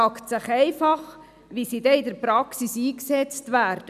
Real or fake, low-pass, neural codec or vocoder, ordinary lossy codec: real; 14.4 kHz; none; none